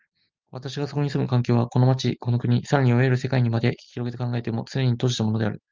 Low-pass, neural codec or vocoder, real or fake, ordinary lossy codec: 7.2 kHz; none; real; Opus, 24 kbps